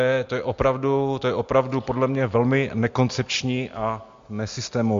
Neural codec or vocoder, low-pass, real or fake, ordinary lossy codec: none; 7.2 kHz; real; MP3, 48 kbps